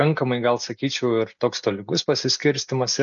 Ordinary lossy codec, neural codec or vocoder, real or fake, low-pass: MP3, 96 kbps; none; real; 7.2 kHz